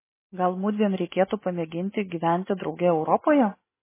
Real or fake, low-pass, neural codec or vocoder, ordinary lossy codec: real; 3.6 kHz; none; MP3, 16 kbps